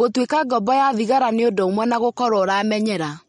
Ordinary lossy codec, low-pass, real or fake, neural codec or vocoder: MP3, 48 kbps; 19.8 kHz; real; none